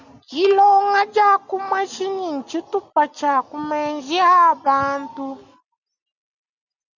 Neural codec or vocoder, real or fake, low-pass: none; real; 7.2 kHz